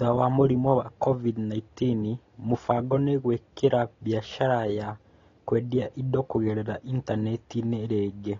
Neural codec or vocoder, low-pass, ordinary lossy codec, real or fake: none; 7.2 kHz; AAC, 32 kbps; real